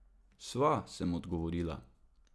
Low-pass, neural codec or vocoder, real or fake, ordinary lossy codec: none; none; real; none